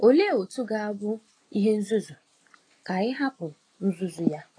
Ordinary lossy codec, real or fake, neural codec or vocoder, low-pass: AAC, 32 kbps; real; none; 9.9 kHz